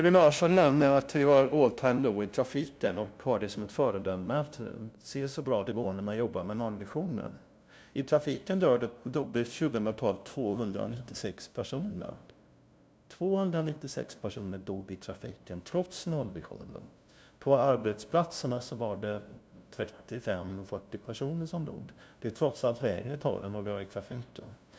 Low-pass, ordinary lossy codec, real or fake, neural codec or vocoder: none; none; fake; codec, 16 kHz, 0.5 kbps, FunCodec, trained on LibriTTS, 25 frames a second